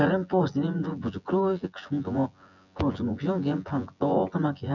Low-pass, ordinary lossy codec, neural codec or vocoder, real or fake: 7.2 kHz; none; vocoder, 24 kHz, 100 mel bands, Vocos; fake